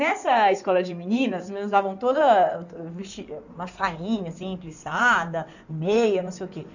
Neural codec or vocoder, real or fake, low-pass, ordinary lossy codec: codec, 16 kHz in and 24 kHz out, 2.2 kbps, FireRedTTS-2 codec; fake; 7.2 kHz; none